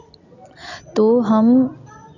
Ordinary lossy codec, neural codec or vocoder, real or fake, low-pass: none; none; real; 7.2 kHz